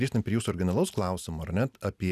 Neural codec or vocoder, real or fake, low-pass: none; real; 14.4 kHz